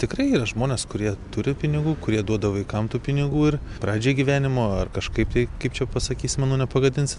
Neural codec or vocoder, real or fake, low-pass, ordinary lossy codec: none; real; 10.8 kHz; MP3, 96 kbps